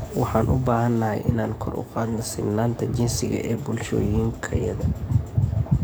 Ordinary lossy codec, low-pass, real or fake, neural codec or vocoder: none; none; fake; codec, 44.1 kHz, 7.8 kbps, DAC